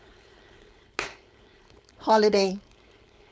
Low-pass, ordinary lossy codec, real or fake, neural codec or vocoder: none; none; fake; codec, 16 kHz, 4.8 kbps, FACodec